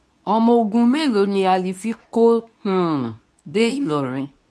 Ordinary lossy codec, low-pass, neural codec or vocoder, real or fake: none; none; codec, 24 kHz, 0.9 kbps, WavTokenizer, medium speech release version 2; fake